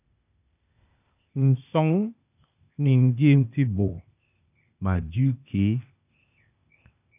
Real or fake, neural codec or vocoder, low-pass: fake; codec, 16 kHz, 0.8 kbps, ZipCodec; 3.6 kHz